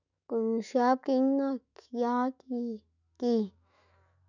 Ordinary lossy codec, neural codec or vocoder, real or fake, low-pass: none; autoencoder, 48 kHz, 128 numbers a frame, DAC-VAE, trained on Japanese speech; fake; 7.2 kHz